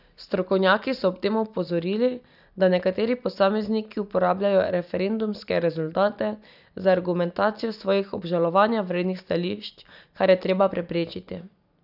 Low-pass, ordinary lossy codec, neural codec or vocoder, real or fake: 5.4 kHz; none; none; real